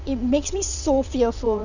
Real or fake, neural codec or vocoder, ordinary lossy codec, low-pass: fake; vocoder, 22.05 kHz, 80 mel bands, WaveNeXt; none; 7.2 kHz